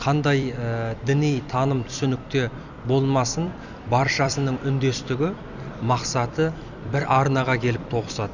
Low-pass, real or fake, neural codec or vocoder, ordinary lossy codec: 7.2 kHz; fake; vocoder, 44.1 kHz, 128 mel bands every 256 samples, BigVGAN v2; none